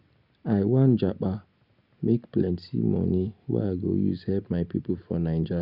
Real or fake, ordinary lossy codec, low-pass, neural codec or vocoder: real; Opus, 64 kbps; 5.4 kHz; none